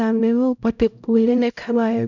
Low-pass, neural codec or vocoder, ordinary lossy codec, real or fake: 7.2 kHz; codec, 16 kHz, 0.5 kbps, X-Codec, HuBERT features, trained on LibriSpeech; none; fake